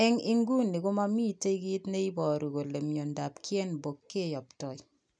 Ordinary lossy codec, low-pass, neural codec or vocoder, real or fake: none; 9.9 kHz; none; real